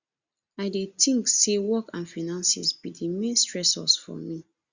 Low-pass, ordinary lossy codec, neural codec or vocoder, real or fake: 7.2 kHz; Opus, 64 kbps; none; real